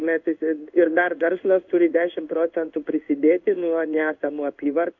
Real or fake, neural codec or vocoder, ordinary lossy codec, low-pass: fake; codec, 16 kHz in and 24 kHz out, 1 kbps, XY-Tokenizer; MP3, 64 kbps; 7.2 kHz